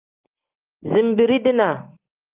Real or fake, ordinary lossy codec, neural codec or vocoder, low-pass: real; Opus, 24 kbps; none; 3.6 kHz